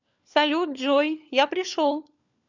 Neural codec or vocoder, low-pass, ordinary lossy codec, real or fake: codec, 16 kHz, 4 kbps, FunCodec, trained on LibriTTS, 50 frames a second; 7.2 kHz; none; fake